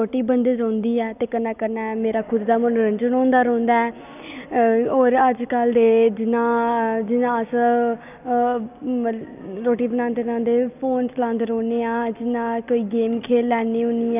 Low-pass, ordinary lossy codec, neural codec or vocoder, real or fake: 3.6 kHz; none; none; real